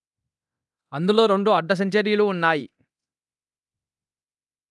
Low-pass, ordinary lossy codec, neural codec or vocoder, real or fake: none; none; codec, 24 kHz, 0.9 kbps, DualCodec; fake